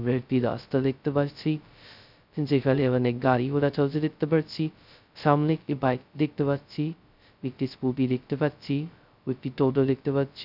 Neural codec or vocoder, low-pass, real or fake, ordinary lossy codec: codec, 16 kHz, 0.2 kbps, FocalCodec; 5.4 kHz; fake; none